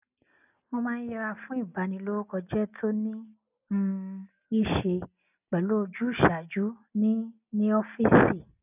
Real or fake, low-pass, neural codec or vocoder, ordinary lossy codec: real; 3.6 kHz; none; none